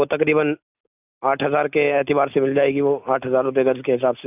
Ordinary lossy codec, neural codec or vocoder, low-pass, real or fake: none; none; 3.6 kHz; real